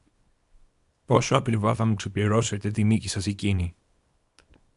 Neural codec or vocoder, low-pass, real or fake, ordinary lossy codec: codec, 24 kHz, 0.9 kbps, WavTokenizer, small release; 10.8 kHz; fake; MP3, 96 kbps